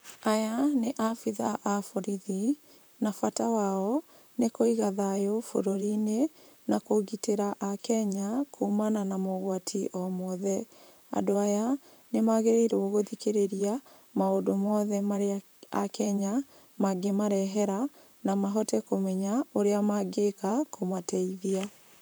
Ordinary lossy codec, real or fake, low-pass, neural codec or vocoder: none; fake; none; vocoder, 44.1 kHz, 128 mel bands, Pupu-Vocoder